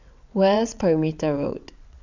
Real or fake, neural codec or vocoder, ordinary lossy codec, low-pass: fake; vocoder, 22.05 kHz, 80 mel bands, WaveNeXt; none; 7.2 kHz